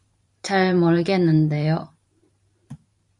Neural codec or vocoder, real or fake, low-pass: vocoder, 44.1 kHz, 128 mel bands every 512 samples, BigVGAN v2; fake; 10.8 kHz